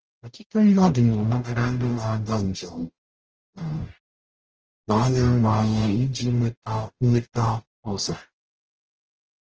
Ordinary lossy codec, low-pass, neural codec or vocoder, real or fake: Opus, 16 kbps; 7.2 kHz; codec, 44.1 kHz, 0.9 kbps, DAC; fake